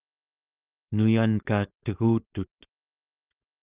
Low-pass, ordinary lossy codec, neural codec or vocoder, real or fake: 3.6 kHz; Opus, 24 kbps; codec, 16 kHz, 4.8 kbps, FACodec; fake